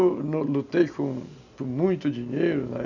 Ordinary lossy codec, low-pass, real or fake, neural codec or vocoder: none; 7.2 kHz; real; none